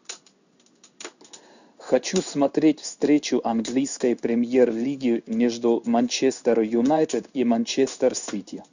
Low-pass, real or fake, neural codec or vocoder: 7.2 kHz; fake; codec, 16 kHz in and 24 kHz out, 1 kbps, XY-Tokenizer